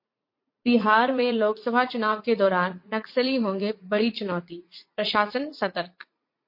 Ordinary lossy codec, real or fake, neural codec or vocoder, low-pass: MP3, 32 kbps; fake; vocoder, 44.1 kHz, 80 mel bands, Vocos; 5.4 kHz